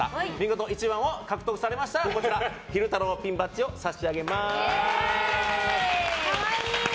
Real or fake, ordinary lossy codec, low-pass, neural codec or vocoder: real; none; none; none